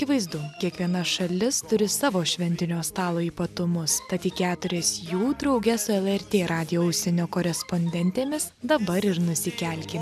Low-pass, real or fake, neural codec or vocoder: 14.4 kHz; real; none